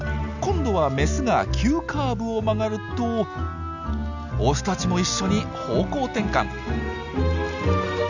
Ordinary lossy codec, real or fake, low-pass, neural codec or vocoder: none; real; 7.2 kHz; none